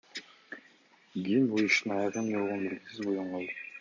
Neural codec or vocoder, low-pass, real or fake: none; 7.2 kHz; real